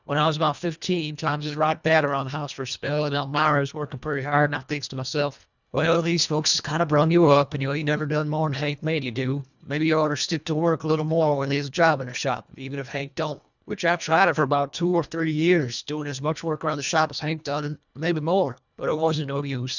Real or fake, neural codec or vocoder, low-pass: fake; codec, 24 kHz, 1.5 kbps, HILCodec; 7.2 kHz